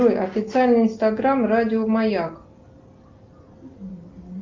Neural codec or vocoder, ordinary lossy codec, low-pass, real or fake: none; Opus, 32 kbps; 7.2 kHz; real